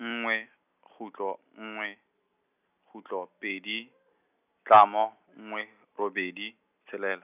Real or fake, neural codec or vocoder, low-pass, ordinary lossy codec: real; none; 3.6 kHz; none